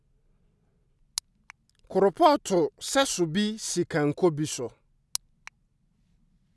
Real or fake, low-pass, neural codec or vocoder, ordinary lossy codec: real; none; none; none